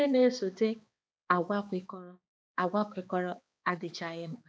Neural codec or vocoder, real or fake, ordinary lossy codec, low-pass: codec, 16 kHz, 2 kbps, X-Codec, HuBERT features, trained on balanced general audio; fake; none; none